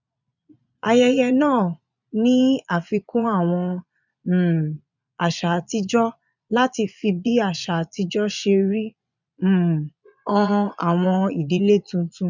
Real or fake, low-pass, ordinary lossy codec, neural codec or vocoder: fake; 7.2 kHz; none; vocoder, 22.05 kHz, 80 mel bands, Vocos